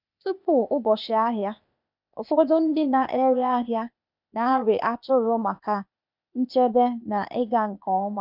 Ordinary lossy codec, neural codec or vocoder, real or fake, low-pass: none; codec, 16 kHz, 0.8 kbps, ZipCodec; fake; 5.4 kHz